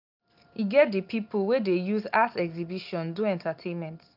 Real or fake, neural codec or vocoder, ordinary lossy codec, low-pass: real; none; none; 5.4 kHz